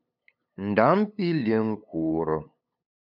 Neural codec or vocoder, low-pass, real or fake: codec, 16 kHz, 8 kbps, FunCodec, trained on LibriTTS, 25 frames a second; 5.4 kHz; fake